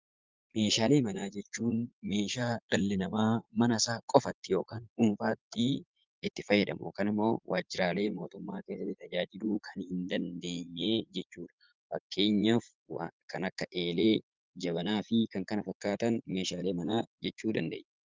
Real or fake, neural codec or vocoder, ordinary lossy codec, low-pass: fake; vocoder, 44.1 kHz, 80 mel bands, Vocos; Opus, 24 kbps; 7.2 kHz